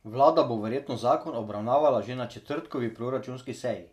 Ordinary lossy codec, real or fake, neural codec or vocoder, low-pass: none; real; none; 14.4 kHz